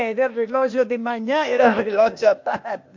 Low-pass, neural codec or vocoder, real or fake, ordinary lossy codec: 7.2 kHz; codec, 16 kHz, 0.8 kbps, ZipCodec; fake; MP3, 48 kbps